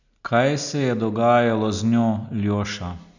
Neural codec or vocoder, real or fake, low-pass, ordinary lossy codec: none; real; 7.2 kHz; none